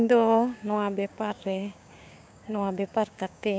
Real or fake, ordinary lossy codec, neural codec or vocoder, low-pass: fake; none; codec, 16 kHz, 6 kbps, DAC; none